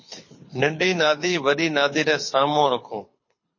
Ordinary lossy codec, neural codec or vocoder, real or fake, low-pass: MP3, 32 kbps; codec, 24 kHz, 6 kbps, HILCodec; fake; 7.2 kHz